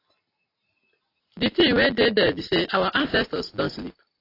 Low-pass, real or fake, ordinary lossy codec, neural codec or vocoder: 5.4 kHz; real; AAC, 32 kbps; none